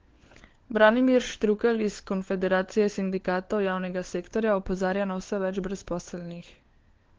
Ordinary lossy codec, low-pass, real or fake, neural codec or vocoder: Opus, 16 kbps; 7.2 kHz; fake; codec, 16 kHz, 4 kbps, FunCodec, trained on LibriTTS, 50 frames a second